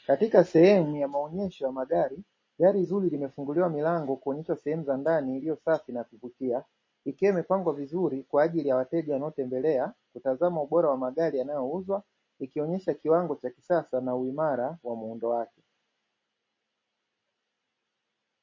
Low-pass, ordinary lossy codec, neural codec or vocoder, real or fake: 7.2 kHz; MP3, 32 kbps; none; real